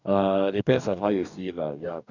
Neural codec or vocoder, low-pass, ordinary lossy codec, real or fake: codec, 44.1 kHz, 2.6 kbps, DAC; 7.2 kHz; none; fake